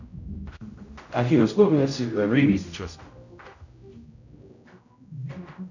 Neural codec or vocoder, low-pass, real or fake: codec, 16 kHz, 0.5 kbps, X-Codec, HuBERT features, trained on general audio; 7.2 kHz; fake